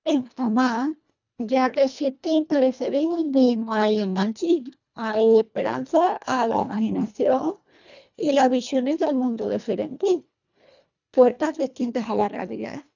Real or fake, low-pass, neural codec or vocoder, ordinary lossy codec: fake; 7.2 kHz; codec, 24 kHz, 1.5 kbps, HILCodec; none